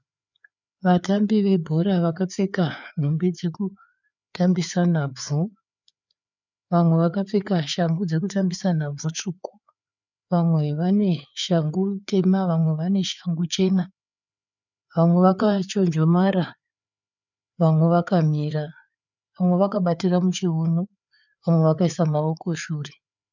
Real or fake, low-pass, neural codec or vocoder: fake; 7.2 kHz; codec, 16 kHz, 4 kbps, FreqCodec, larger model